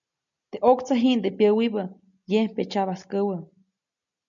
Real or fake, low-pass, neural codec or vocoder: real; 7.2 kHz; none